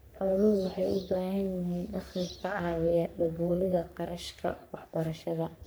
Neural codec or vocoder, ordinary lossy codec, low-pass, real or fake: codec, 44.1 kHz, 3.4 kbps, Pupu-Codec; none; none; fake